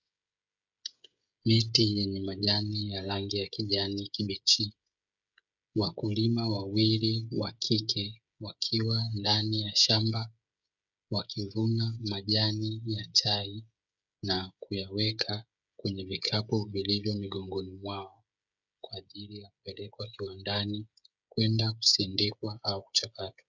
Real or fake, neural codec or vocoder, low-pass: fake; codec, 16 kHz, 16 kbps, FreqCodec, smaller model; 7.2 kHz